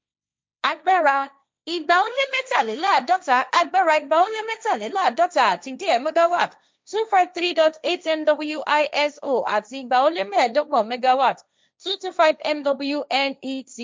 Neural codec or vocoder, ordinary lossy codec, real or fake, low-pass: codec, 16 kHz, 1.1 kbps, Voila-Tokenizer; none; fake; none